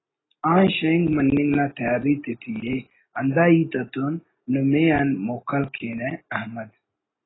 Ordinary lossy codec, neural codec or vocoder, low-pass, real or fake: AAC, 16 kbps; none; 7.2 kHz; real